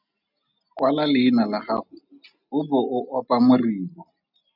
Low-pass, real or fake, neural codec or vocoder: 5.4 kHz; real; none